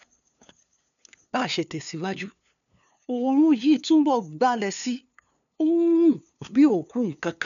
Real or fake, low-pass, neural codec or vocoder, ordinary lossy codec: fake; 7.2 kHz; codec, 16 kHz, 2 kbps, FunCodec, trained on LibriTTS, 25 frames a second; none